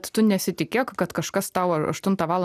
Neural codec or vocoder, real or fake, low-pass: none; real; 14.4 kHz